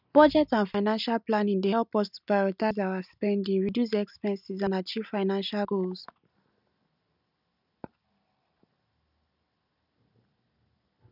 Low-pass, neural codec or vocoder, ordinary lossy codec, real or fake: 5.4 kHz; none; none; real